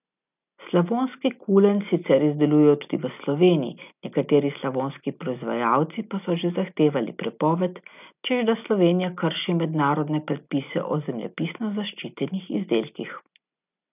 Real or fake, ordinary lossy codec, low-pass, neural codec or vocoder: real; none; 3.6 kHz; none